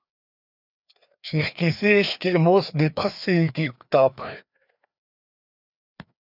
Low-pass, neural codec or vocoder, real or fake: 5.4 kHz; codec, 16 kHz, 2 kbps, FreqCodec, larger model; fake